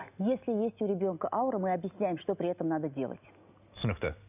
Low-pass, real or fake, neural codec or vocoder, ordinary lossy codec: 3.6 kHz; real; none; none